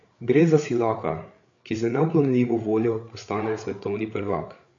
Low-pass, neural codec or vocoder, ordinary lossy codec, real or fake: 7.2 kHz; codec, 16 kHz, 8 kbps, FreqCodec, larger model; AAC, 48 kbps; fake